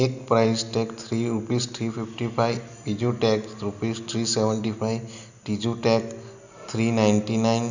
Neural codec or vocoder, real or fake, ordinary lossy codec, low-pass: none; real; none; 7.2 kHz